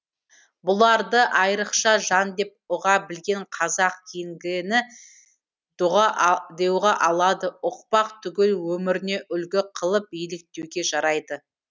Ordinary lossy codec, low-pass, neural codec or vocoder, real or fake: none; none; none; real